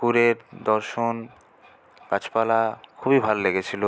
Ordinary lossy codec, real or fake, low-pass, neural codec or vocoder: none; real; none; none